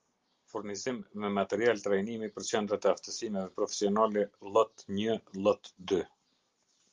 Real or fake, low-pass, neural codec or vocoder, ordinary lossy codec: real; 7.2 kHz; none; Opus, 32 kbps